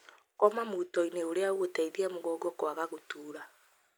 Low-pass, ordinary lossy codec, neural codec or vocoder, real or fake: none; none; none; real